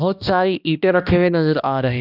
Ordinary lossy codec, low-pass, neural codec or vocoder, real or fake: none; 5.4 kHz; codec, 16 kHz, 1 kbps, X-Codec, HuBERT features, trained on balanced general audio; fake